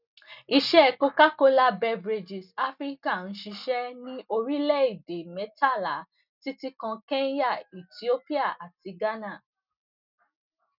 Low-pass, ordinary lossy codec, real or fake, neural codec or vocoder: 5.4 kHz; none; real; none